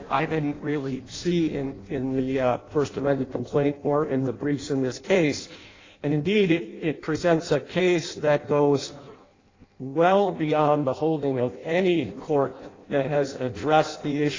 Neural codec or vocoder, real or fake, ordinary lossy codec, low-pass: codec, 16 kHz in and 24 kHz out, 0.6 kbps, FireRedTTS-2 codec; fake; AAC, 32 kbps; 7.2 kHz